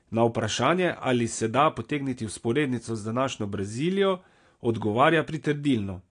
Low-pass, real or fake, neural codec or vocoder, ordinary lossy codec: 9.9 kHz; real; none; AAC, 48 kbps